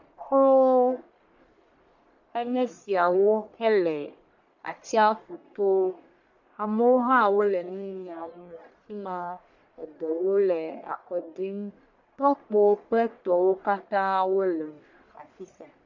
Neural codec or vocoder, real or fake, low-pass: codec, 44.1 kHz, 1.7 kbps, Pupu-Codec; fake; 7.2 kHz